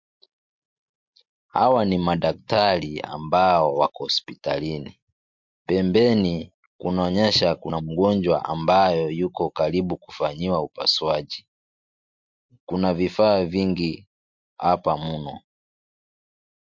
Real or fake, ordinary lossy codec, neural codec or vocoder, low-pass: real; MP3, 48 kbps; none; 7.2 kHz